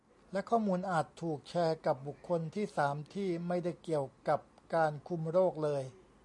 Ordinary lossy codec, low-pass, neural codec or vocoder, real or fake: MP3, 96 kbps; 10.8 kHz; none; real